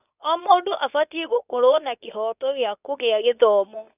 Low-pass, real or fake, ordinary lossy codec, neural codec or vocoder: 3.6 kHz; fake; none; codec, 24 kHz, 0.9 kbps, WavTokenizer, medium speech release version 2